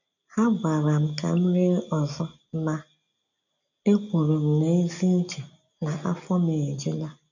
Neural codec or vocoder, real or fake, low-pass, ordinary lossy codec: none; real; 7.2 kHz; none